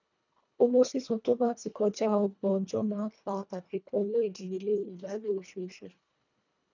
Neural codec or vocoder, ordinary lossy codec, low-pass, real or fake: codec, 24 kHz, 1.5 kbps, HILCodec; none; 7.2 kHz; fake